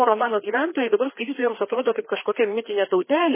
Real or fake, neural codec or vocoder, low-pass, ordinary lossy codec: fake; codec, 16 kHz, 2 kbps, FreqCodec, larger model; 3.6 kHz; MP3, 16 kbps